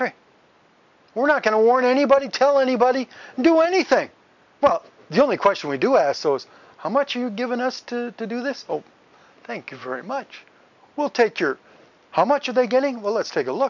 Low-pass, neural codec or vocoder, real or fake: 7.2 kHz; none; real